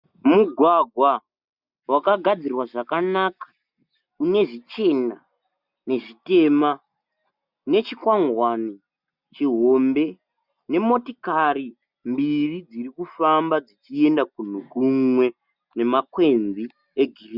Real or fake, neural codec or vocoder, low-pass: real; none; 5.4 kHz